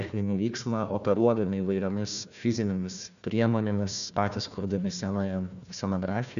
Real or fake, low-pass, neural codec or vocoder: fake; 7.2 kHz; codec, 16 kHz, 1 kbps, FunCodec, trained on Chinese and English, 50 frames a second